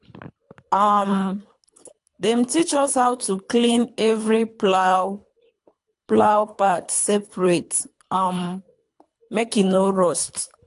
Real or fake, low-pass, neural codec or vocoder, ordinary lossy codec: fake; 10.8 kHz; codec, 24 kHz, 3 kbps, HILCodec; AAC, 64 kbps